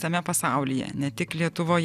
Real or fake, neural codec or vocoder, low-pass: real; none; 14.4 kHz